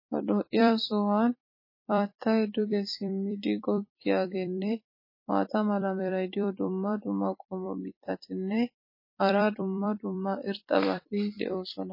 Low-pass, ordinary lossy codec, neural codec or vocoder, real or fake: 5.4 kHz; MP3, 24 kbps; vocoder, 44.1 kHz, 128 mel bands every 256 samples, BigVGAN v2; fake